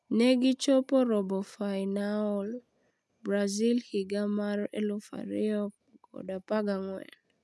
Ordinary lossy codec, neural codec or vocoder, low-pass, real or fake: none; none; none; real